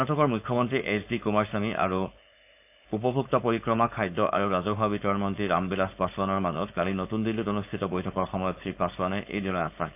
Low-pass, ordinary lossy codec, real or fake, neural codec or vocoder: 3.6 kHz; AAC, 32 kbps; fake; codec, 16 kHz, 4.8 kbps, FACodec